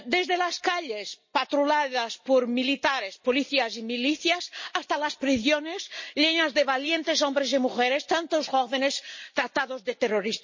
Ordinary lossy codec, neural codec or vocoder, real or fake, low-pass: MP3, 32 kbps; none; real; 7.2 kHz